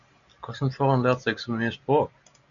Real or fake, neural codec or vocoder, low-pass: real; none; 7.2 kHz